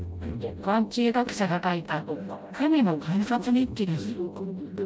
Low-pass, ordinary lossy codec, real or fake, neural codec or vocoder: none; none; fake; codec, 16 kHz, 0.5 kbps, FreqCodec, smaller model